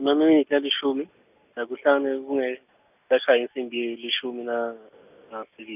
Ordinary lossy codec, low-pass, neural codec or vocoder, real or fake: none; 3.6 kHz; none; real